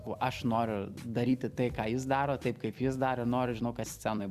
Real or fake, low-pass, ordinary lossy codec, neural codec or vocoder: fake; 14.4 kHz; Opus, 64 kbps; vocoder, 44.1 kHz, 128 mel bands every 256 samples, BigVGAN v2